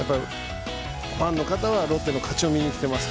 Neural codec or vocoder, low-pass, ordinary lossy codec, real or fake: none; none; none; real